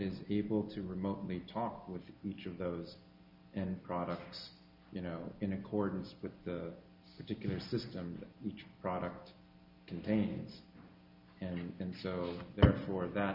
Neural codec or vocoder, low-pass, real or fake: none; 5.4 kHz; real